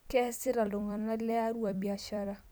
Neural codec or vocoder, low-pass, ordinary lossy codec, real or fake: vocoder, 44.1 kHz, 128 mel bands every 256 samples, BigVGAN v2; none; none; fake